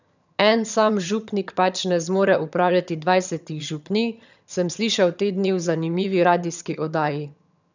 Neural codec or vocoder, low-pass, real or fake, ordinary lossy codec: vocoder, 22.05 kHz, 80 mel bands, HiFi-GAN; 7.2 kHz; fake; none